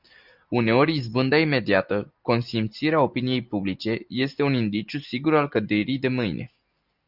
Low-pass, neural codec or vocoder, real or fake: 5.4 kHz; none; real